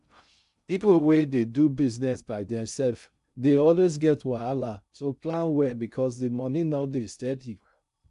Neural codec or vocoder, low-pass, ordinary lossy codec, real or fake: codec, 16 kHz in and 24 kHz out, 0.6 kbps, FocalCodec, streaming, 2048 codes; 10.8 kHz; none; fake